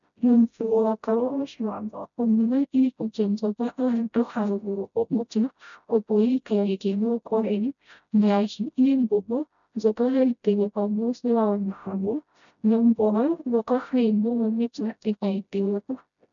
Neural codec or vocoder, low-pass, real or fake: codec, 16 kHz, 0.5 kbps, FreqCodec, smaller model; 7.2 kHz; fake